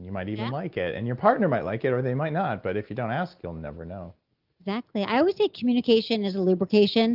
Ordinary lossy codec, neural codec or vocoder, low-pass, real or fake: Opus, 24 kbps; none; 5.4 kHz; real